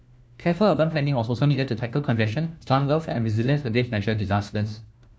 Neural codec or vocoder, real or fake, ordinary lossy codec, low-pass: codec, 16 kHz, 1 kbps, FunCodec, trained on LibriTTS, 50 frames a second; fake; none; none